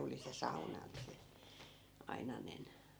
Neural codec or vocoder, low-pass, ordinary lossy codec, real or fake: vocoder, 44.1 kHz, 128 mel bands every 256 samples, BigVGAN v2; none; none; fake